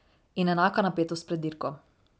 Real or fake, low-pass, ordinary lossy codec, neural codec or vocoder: real; none; none; none